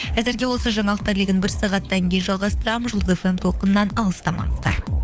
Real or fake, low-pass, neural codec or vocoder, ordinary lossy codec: fake; none; codec, 16 kHz, 4 kbps, FunCodec, trained on LibriTTS, 50 frames a second; none